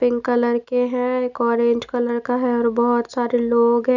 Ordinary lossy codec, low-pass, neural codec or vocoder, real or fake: none; 7.2 kHz; none; real